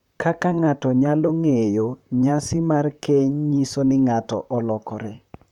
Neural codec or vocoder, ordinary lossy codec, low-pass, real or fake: vocoder, 44.1 kHz, 128 mel bands, Pupu-Vocoder; none; 19.8 kHz; fake